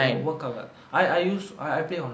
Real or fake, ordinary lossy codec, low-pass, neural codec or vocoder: real; none; none; none